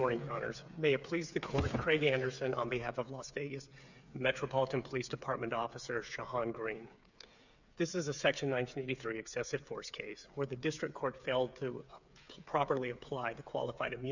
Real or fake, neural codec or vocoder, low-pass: fake; codec, 16 kHz, 8 kbps, FreqCodec, smaller model; 7.2 kHz